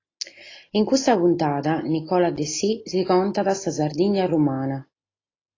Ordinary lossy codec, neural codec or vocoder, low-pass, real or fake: AAC, 32 kbps; none; 7.2 kHz; real